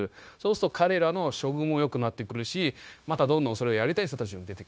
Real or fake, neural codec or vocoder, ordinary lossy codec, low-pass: fake; codec, 16 kHz, 0.9 kbps, LongCat-Audio-Codec; none; none